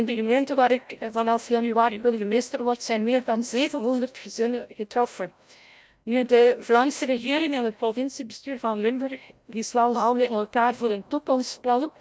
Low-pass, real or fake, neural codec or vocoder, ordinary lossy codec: none; fake; codec, 16 kHz, 0.5 kbps, FreqCodec, larger model; none